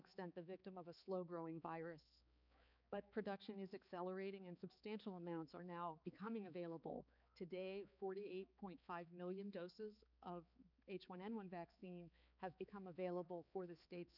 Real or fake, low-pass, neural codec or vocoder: fake; 5.4 kHz; codec, 16 kHz, 4 kbps, X-Codec, HuBERT features, trained on balanced general audio